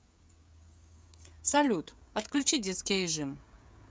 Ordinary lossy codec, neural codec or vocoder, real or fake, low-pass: none; codec, 16 kHz, 16 kbps, FreqCodec, smaller model; fake; none